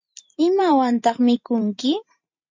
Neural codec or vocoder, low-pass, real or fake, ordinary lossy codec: vocoder, 44.1 kHz, 128 mel bands every 512 samples, BigVGAN v2; 7.2 kHz; fake; MP3, 48 kbps